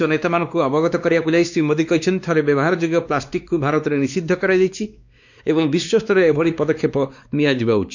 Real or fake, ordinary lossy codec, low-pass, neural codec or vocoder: fake; none; 7.2 kHz; codec, 16 kHz, 2 kbps, X-Codec, WavLM features, trained on Multilingual LibriSpeech